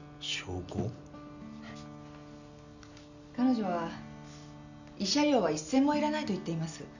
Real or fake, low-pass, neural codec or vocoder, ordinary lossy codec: real; 7.2 kHz; none; none